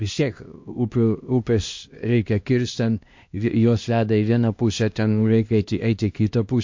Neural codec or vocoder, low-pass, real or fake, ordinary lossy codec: codec, 16 kHz, 1 kbps, X-Codec, HuBERT features, trained on LibriSpeech; 7.2 kHz; fake; MP3, 48 kbps